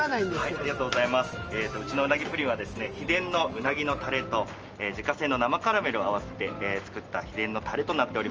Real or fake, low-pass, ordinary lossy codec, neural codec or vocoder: real; 7.2 kHz; Opus, 24 kbps; none